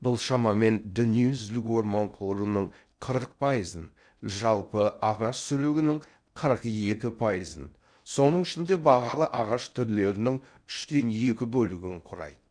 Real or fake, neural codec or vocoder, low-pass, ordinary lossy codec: fake; codec, 16 kHz in and 24 kHz out, 0.6 kbps, FocalCodec, streaming, 4096 codes; 9.9 kHz; none